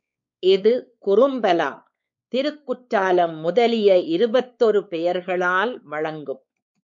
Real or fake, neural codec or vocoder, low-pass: fake; codec, 16 kHz, 4 kbps, X-Codec, WavLM features, trained on Multilingual LibriSpeech; 7.2 kHz